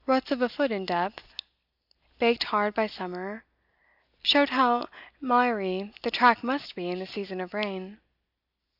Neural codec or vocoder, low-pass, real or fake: none; 5.4 kHz; real